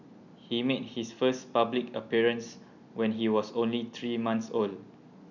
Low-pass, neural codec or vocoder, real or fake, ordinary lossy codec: 7.2 kHz; none; real; none